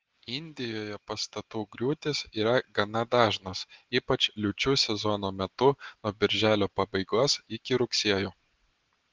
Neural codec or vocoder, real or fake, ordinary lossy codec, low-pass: none; real; Opus, 16 kbps; 7.2 kHz